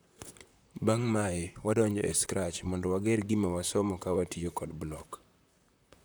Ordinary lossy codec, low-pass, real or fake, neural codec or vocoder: none; none; fake; vocoder, 44.1 kHz, 128 mel bands, Pupu-Vocoder